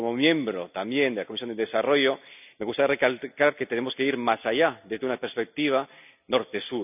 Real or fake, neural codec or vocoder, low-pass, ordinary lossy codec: real; none; 3.6 kHz; none